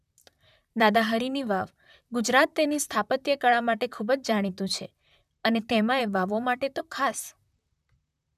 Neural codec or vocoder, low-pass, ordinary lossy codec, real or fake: vocoder, 44.1 kHz, 128 mel bands, Pupu-Vocoder; 14.4 kHz; none; fake